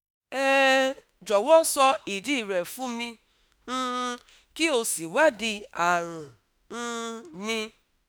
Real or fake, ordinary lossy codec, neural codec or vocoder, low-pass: fake; none; autoencoder, 48 kHz, 32 numbers a frame, DAC-VAE, trained on Japanese speech; none